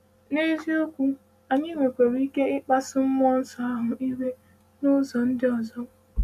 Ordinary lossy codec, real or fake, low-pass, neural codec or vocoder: none; real; 14.4 kHz; none